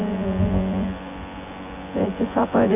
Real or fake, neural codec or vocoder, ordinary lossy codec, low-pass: fake; vocoder, 24 kHz, 100 mel bands, Vocos; AAC, 24 kbps; 3.6 kHz